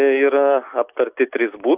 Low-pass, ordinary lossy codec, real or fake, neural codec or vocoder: 3.6 kHz; Opus, 64 kbps; real; none